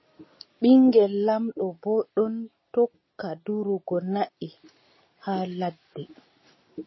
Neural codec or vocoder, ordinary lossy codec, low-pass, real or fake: none; MP3, 24 kbps; 7.2 kHz; real